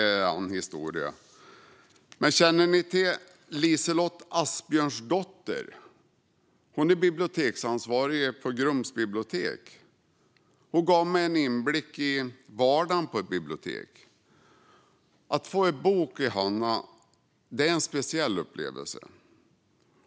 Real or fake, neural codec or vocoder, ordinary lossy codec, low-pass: real; none; none; none